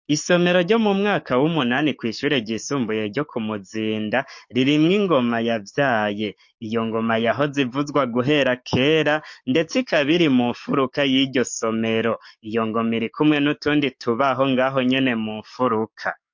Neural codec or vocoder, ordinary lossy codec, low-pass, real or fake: codec, 44.1 kHz, 7.8 kbps, DAC; MP3, 48 kbps; 7.2 kHz; fake